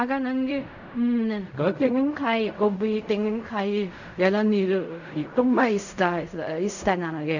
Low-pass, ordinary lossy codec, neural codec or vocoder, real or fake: 7.2 kHz; none; codec, 16 kHz in and 24 kHz out, 0.4 kbps, LongCat-Audio-Codec, fine tuned four codebook decoder; fake